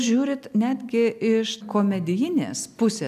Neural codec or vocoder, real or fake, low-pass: none; real; 14.4 kHz